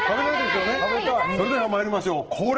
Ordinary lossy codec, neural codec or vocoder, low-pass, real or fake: Opus, 16 kbps; none; 7.2 kHz; real